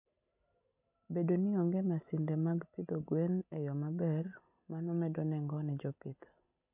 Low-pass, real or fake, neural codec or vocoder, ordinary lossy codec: 3.6 kHz; real; none; none